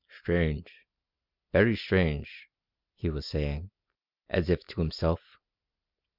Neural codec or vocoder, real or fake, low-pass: none; real; 5.4 kHz